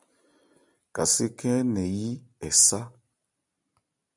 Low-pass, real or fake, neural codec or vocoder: 10.8 kHz; real; none